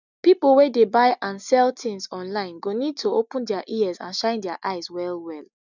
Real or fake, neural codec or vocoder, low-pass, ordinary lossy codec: real; none; 7.2 kHz; none